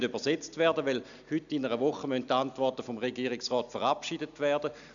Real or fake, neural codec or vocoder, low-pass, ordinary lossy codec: real; none; 7.2 kHz; none